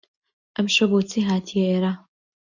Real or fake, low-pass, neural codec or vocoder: real; 7.2 kHz; none